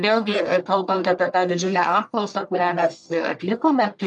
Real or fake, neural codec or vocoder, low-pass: fake; codec, 44.1 kHz, 1.7 kbps, Pupu-Codec; 10.8 kHz